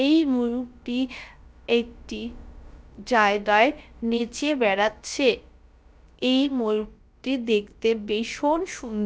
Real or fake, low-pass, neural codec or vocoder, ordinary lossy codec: fake; none; codec, 16 kHz, about 1 kbps, DyCAST, with the encoder's durations; none